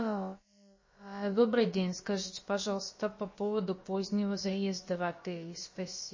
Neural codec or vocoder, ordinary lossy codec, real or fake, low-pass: codec, 16 kHz, about 1 kbps, DyCAST, with the encoder's durations; MP3, 32 kbps; fake; 7.2 kHz